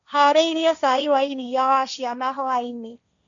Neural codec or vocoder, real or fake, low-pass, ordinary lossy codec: codec, 16 kHz, 1.1 kbps, Voila-Tokenizer; fake; 7.2 kHz; none